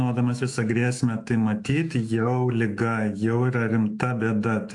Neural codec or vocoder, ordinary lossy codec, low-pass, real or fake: autoencoder, 48 kHz, 128 numbers a frame, DAC-VAE, trained on Japanese speech; AAC, 64 kbps; 10.8 kHz; fake